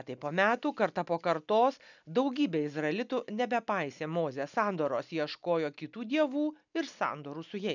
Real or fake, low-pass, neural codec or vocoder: real; 7.2 kHz; none